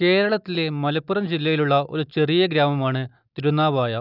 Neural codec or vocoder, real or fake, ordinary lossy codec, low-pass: none; real; none; 5.4 kHz